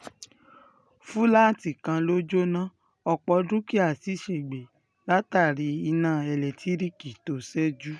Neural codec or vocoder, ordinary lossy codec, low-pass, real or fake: none; none; none; real